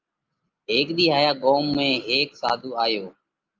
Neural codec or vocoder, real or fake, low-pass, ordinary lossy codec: none; real; 7.2 kHz; Opus, 32 kbps